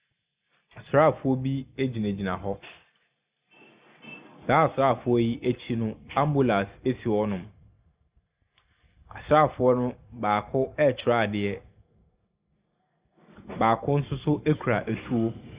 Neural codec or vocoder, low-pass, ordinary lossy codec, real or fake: none; 3.6 kHz; Opus, 64 kbps; real